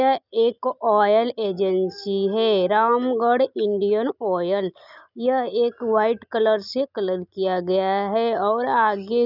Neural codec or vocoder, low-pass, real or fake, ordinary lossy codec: none; 5.4 kHz; real; none